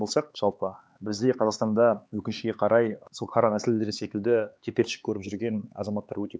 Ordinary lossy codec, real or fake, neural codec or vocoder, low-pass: none; fake; codec, 16 kHz, 4 kbps, X-Codec, HuBERT features, trained on LibriSpeech; none